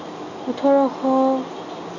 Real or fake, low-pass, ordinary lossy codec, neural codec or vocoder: real; 7.2 kHz; none; none